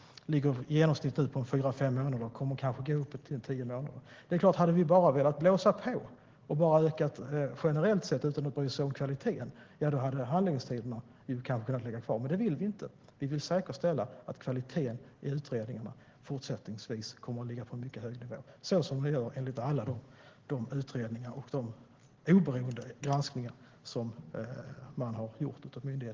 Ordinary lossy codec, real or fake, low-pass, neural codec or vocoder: Opus, 16 kbps; real; 7.2 kHz; none